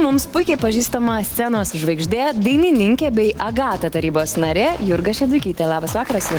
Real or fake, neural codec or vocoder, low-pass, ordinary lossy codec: fake; autoencoder, 48 kHz, 128 numbers a frame, DAC-VAE, trained on Japanese speech; 19.8 kHz; Opus, 16 kbps